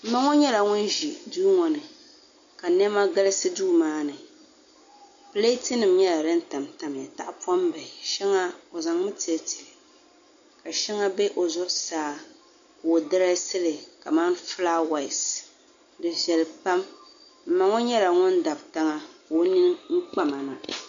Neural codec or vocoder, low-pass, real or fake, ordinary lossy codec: none; 7.2 kHz; real; AAC, 64 kbps